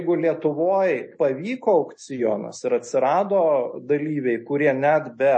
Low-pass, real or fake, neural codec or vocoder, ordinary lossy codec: 9.9 kHz; real; none; MP3, 32 kbps